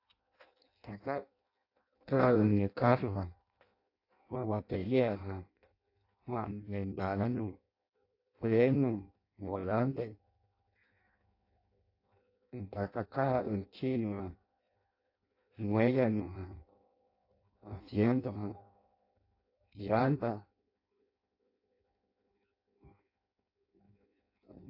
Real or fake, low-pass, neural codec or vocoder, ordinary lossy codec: fake; 5.4 kHz; codec, 16 kHz in and 24 kHz out, 0.6 kbps, FireRedTTS-2 codec; AAC, 32 kbps